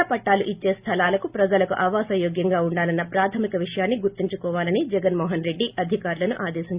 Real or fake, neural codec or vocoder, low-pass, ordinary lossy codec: real; none; 3.6 kHz; Opus, 64 kbps